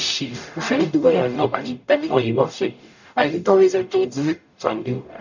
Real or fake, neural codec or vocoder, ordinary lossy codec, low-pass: fake; codec, 44.1 kHz, 0.9 kbps, DAC; none; 7.2 kHz